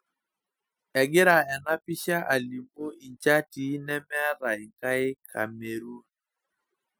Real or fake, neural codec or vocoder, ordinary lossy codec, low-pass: real; none; none; none